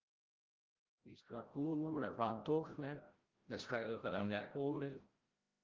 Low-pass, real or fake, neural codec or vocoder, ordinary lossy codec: 7.2 kHz; fake; codec, 16 kHz, 0.5 kbps, FreqCodec, larger model; Opus, 16 kbps